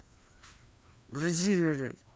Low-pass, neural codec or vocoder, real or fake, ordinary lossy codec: none; codec, 16 kHz, 2 kbps, FreqCodec, larger model; fake; none